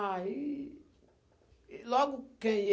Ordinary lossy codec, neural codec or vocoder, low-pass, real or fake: none; none; none; real